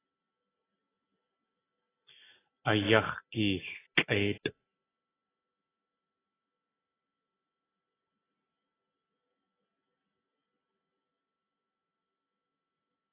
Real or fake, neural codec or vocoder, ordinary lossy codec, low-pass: real; none; AAC, 16 kbps; 3.6 kHz